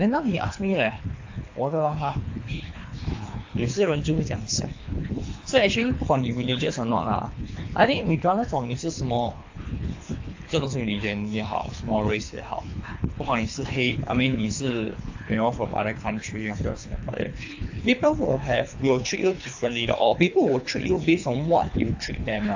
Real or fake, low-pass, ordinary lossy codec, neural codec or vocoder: fake; 7.2 kHz; AAC, 48 kbps; codec, 24 kHz, 3 kbps, HILCodec